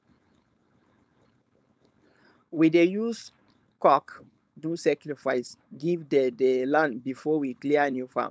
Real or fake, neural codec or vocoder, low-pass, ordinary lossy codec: fake; codec, 16 kHz, 4.8 kbps, FACodec; none; none